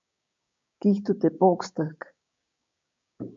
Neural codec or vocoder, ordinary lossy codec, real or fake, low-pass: codec, 16 kHz, 6 kbps, DAC; MP3, 48 kbps; fake; 7.2 kHz